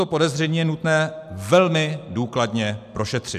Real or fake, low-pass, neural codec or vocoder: real; 14.4 kHz; none